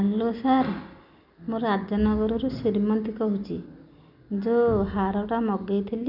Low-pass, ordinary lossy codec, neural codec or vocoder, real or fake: 5.4 kHz; none; none; real